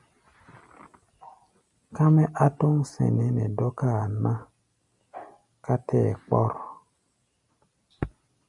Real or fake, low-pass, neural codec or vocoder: real; 10.8 kHz; none